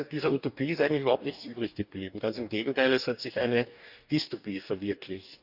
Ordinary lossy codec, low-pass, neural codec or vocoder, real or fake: none; 5.4 kHz; codec, 44.1 kHz, 2.6 kbps, DAC; fake